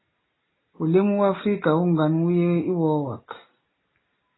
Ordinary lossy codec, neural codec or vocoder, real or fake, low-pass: AAC, 16 kbps; none; real; 7.2 kHz